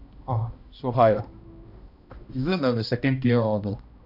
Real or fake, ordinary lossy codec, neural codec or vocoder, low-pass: fake; none; codec, 16 kHz, 1 kbps, X-Codec, HuBERT features, trained on balanced general audio; 5.4 kHz